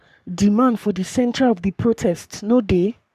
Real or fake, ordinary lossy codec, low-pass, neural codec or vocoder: fake; none; 14.4 kHz; codec, 44.1 kHz, 3.4 kbps, Pupu-Codec